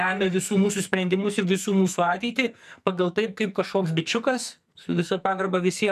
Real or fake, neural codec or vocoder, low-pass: fake; codec, 44.1 kHz, 2.6 kbps, SNAC; 14.4 kHz